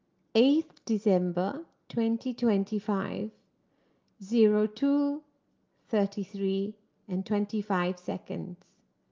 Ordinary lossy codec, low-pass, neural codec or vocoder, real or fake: Opus, 24 kbps; 7.2 kHz; none; real